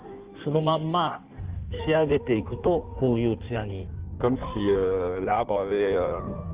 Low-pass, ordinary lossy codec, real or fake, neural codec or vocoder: 3.6 kHz; Opus, 24 kbps; fake; codec, 16 kHz in and 24 kHz out, 1.1 kbps, FireRedTTS-2 codec